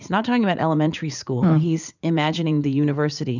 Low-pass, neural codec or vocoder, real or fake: 7.2 kHz; vocoder, 44.1 kHz, 80 mel bands, Vocos; fake